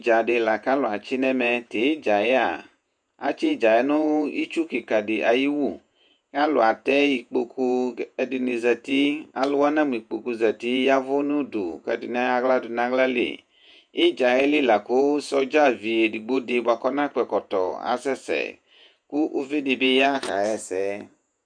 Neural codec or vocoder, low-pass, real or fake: vocoder, 48 kHz, 128 mel bands, Vocos; 9.9 kHz; fake